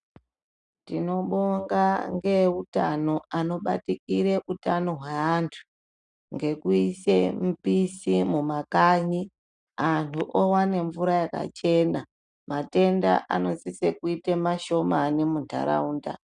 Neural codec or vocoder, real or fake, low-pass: none; real; 9.9 kHz